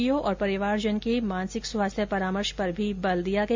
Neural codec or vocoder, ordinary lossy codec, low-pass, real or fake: none; MP3, 48 kbps; 7.2 kHz; real